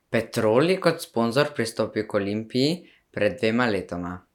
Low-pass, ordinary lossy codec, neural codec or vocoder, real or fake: 19.8 kHz; none; none; real